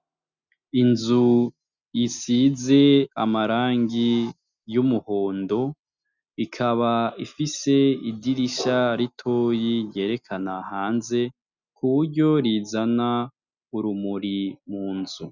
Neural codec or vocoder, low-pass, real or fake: none; 7.2 kHz; real